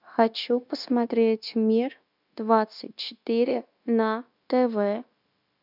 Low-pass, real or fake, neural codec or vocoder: 5.4 kHz; fake; codec, 16 kHz, 0.9 kbps, LongCat-Audio-Codec